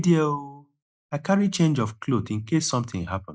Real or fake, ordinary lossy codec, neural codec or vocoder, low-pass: real; none; none; none